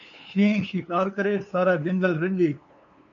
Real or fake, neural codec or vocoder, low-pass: fake; codec, 16 kHz, 2 kbps, FunCodec, trained on LibriTTS, 25 frames a second; 7.2 kHz